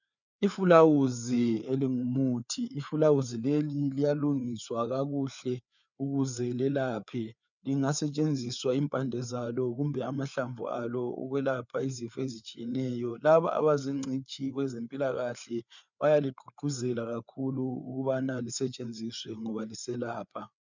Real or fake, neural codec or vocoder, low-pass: fake; codec, 16 kHz, 4 kbps, FreqCodec, larger model; 7.2 kHz